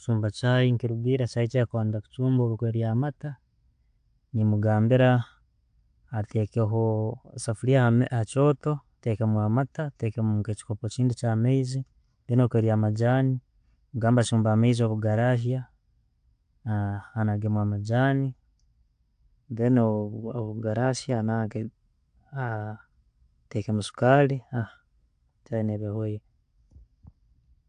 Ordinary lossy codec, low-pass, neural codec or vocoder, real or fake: AAC, 64 kbps; 9.9 kHz; none; real